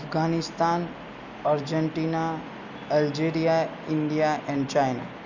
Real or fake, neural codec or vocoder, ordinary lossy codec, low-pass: real; none; none; 7.2 kHz